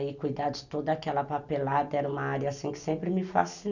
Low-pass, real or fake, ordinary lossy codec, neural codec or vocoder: 7.2 kHz; real; none; none